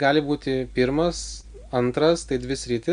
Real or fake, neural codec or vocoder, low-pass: real; none; 9.9 kHz